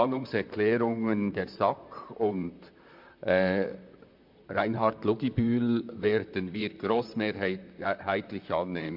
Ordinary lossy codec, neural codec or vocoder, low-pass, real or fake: MP3, 48 kbps; vocoder, 44.1 kHz, 128 mel bands, Pupu-Vocoder; 5.4 kHz; fake